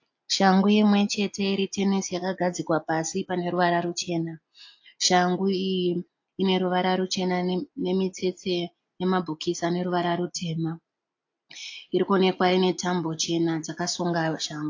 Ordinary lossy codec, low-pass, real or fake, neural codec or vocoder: AAC, 48 kbps; 7.2 kHz; real; none